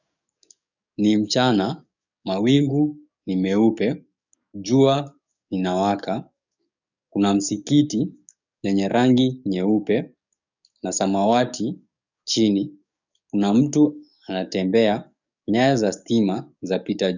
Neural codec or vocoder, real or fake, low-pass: codec, 44.1 kHz, 7.8 kbps, DAC; fake; 7.2 kHz